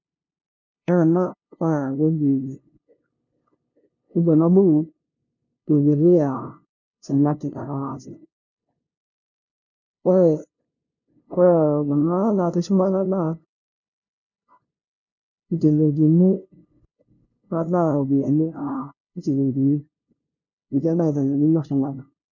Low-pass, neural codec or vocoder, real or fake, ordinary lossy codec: 7.2 kHz; codec, 16 kHz, 0.5 kbps, FunCodec, trained on LibriTTS, 25 frames a second; fake; none